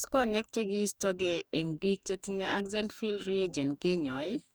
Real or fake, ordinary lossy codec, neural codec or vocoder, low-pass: fake; none; codec, 44.1 kHz, 2.6 kbps, DAC; none